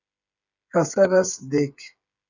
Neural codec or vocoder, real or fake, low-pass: codec, 16 kHz, 8 kbps, FreqCodec, smaller model; fake; 7.2 kHz